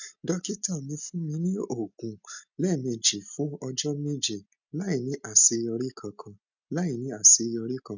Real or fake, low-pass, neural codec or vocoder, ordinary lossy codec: real; 7.2 kHz; none; none